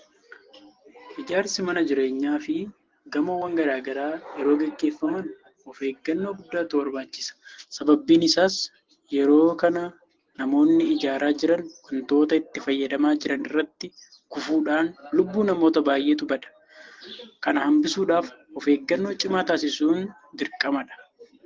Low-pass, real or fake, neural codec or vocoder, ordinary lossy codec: 7.2 kHz; real; none; Opus, 16 kbps